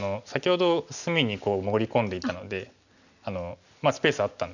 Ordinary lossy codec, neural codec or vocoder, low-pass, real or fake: none; none; 7.2 kHz; real